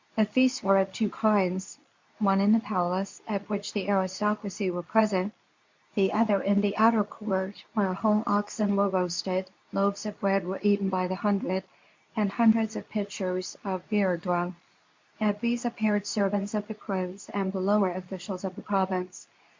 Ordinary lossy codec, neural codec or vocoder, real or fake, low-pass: MP3, 64 kbps; codec, 24 kHz, 0.9 kbps, WavTokenizer, medium speech release version 2; fake; 7.2 kHz